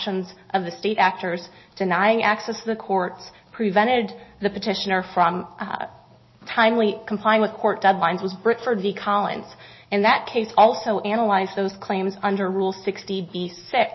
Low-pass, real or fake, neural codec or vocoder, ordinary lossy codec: 7.2 kHz; real; none; MP3, 24 kbps